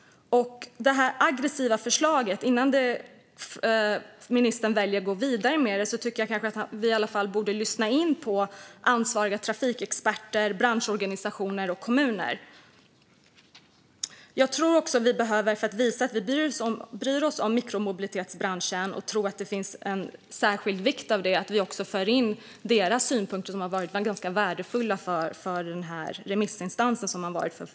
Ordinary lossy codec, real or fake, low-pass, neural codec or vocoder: none; real; none; none